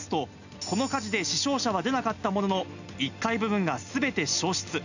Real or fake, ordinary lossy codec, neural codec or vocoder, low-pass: real; none; none; 7.2 kHz